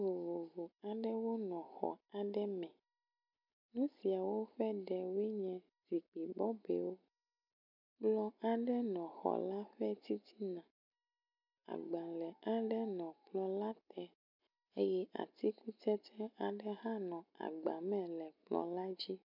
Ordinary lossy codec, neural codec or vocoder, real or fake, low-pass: MP3, 48 kbps; none; real; 5.4 kHz